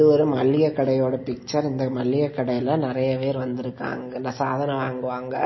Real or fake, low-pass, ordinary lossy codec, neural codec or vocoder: real; 7.2 kHz; MP3, 24 kbps; none